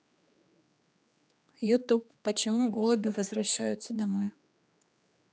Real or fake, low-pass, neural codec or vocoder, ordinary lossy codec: fake; none; codec, 16 kHz, 2 kbps, X-Codec, HuBERT features, trained on general audio; none